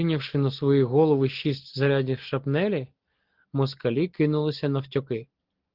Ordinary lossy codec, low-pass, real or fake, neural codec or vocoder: Opus, 16 kbps; 5.4 kHz; real; none